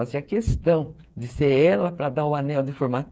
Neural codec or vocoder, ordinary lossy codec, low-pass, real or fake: codec, 16 kHz, 4 kbps, FreqCodec, smaller model; none; none; fake